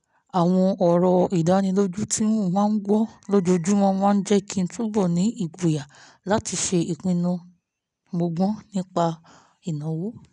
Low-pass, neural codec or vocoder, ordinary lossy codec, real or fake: 10.8 kHz; none; none; real